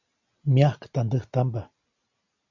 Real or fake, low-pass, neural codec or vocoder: real; 7.2 kHz; none